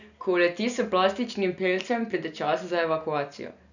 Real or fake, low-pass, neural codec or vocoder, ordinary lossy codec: real; 7.2 kHz; none; none